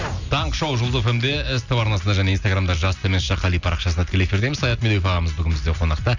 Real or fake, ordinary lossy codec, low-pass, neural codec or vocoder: real; none; 7.2 kHz; none